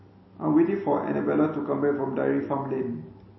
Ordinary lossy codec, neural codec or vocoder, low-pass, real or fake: MP3, 24 kbps; none; 7.2 kHz; real